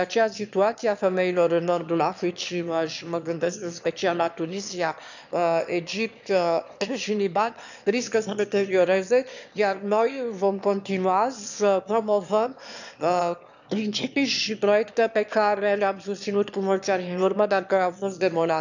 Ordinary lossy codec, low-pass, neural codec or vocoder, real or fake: none; 7.2 kHz; autoencoder, 22.05 kHz, a latent of 192 numbers a frame, VITS, trained on one speaker; fake